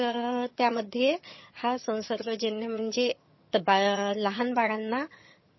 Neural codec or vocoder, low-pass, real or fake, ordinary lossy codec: vocoder, 22.05 kHz, 80 mel bands, HiFi-GAN; 7.2 kHz; fake; MP3, 24 kbps